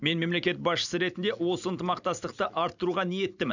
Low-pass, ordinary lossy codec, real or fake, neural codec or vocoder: 7.2 kHz; none; real; none